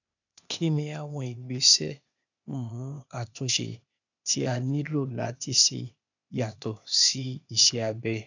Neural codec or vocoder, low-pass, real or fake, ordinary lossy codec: codec, 16 kHz, 0.8 kbps, ZipCodec; 7.2 kHz; fake; none